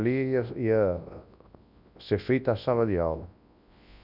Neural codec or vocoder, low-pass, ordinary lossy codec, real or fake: codec, 24 kHz, 0.9 kbps, WavTokenizer, large speech release; 5.4 kHz; none; fake